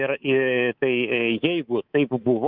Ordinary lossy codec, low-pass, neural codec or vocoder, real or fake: Opus, 64 kbps; 5.4 kHz; codec, 24 kHz, 3.1 kbps, DualCodec; fake